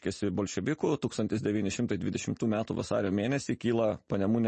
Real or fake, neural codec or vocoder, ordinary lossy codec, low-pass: real; none; MP3, 32 kbps; 10.8 kHz